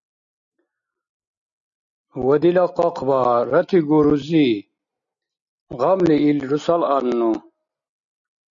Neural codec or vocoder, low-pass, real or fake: none; 7.2 kHz; real